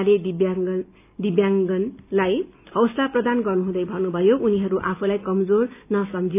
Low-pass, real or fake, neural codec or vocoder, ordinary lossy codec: 3.6 kHz; real; none; none